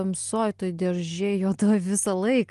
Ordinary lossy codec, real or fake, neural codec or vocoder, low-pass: Opus, 24 kbps; real; none; 10.8 kHz